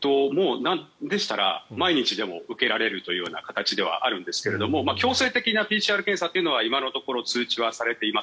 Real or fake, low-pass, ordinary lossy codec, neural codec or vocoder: real; none; none; none